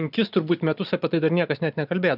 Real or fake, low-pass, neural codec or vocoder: real; 5.4 kHz; none